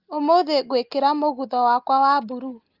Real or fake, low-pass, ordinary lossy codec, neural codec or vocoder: real; 5.4 kHz; Opus, 32 kbps; none